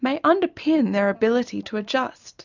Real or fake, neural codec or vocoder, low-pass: real; none; 7.2 kHz